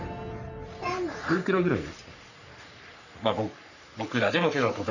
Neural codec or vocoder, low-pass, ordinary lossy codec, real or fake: codec, 44.1 kHz, 3.4 kbps, Pupu-Codec; 7.2 kHz; none; fake